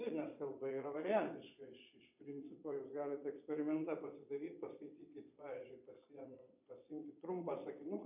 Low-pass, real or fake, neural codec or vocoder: 3.6 kHz; fake; vocoder, 22.05 kHz, 80 mel bands, Vocos